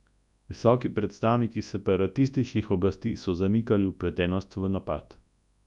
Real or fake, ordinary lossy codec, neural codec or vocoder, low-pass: fake; none; codec, 24 kHz, 0.9 kbps, WavTokenizer, large speech release; 10.8 kHz